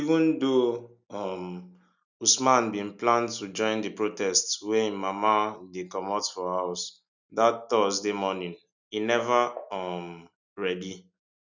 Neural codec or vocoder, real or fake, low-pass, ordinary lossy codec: none; real; 7.2 kHz; none